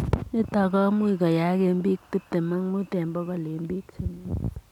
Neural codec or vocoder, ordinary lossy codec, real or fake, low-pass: none; none; real; 19.8 kHz